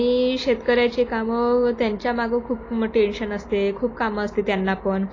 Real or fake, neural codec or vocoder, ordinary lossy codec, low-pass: real; none; AAC, 32 kbps; 7.2 kHz